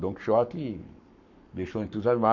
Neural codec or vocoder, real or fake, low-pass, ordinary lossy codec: codec, 44.1 kHz, 7.8 kbps, Pupu-Codec; fake; 7.2 kHz; none